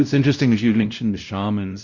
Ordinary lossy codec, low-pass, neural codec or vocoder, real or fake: Opus, 64 kbps; 7.2 kHz; codec, 16 kHz, 0.5 kbps, X-Codec, WavLM features, trained on Multilingual LibriSpeech; fake